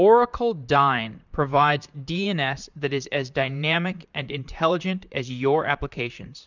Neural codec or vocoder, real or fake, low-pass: vocoder, 44.1 kHz, 128 mel bands, Pupu-Vocoder; fake; 7.2 kHz